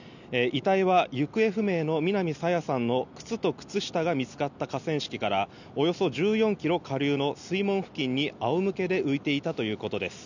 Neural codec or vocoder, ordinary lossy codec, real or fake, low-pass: none; none; real; 7.2 kHz